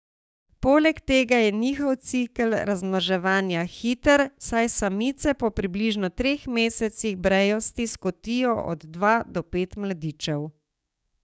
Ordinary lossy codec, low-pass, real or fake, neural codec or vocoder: none; none; fake; codec, 16 kHz, 6 kbps, DAC